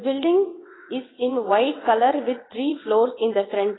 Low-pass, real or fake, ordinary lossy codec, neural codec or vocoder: 7.2 kHz; fake; AAC, 16 kbps; vocoder, 22.05 kHz, 80 mel bands, WaveNeXt